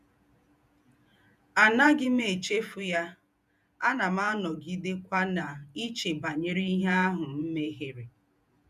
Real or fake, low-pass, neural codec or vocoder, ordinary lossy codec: fake; 14.4 kHz; vocoder, 48 kHz, 128 mel bands, Vocos; none